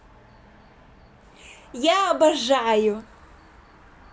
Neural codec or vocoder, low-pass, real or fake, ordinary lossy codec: none; none; real; none